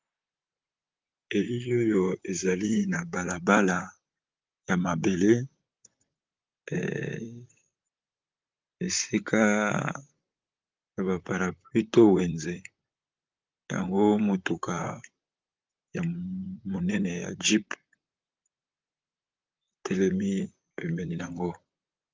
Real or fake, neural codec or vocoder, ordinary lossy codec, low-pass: fake; vocoder, 44.1 kHz, 128 mel bands, Pupu-Vocoder; Opus, 24 kbps; 7.2 kHz